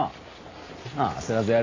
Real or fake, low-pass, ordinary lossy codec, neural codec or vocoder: real; 7.2 kHz; AAC, 32 kbps; none